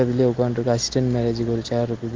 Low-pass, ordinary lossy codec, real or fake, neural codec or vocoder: 7.2 kHz; Opus, 16 kbps; real; none